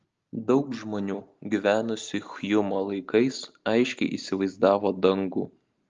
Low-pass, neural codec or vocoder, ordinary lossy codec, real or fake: 7.2 kHz; none; Opus, 32 kbps; real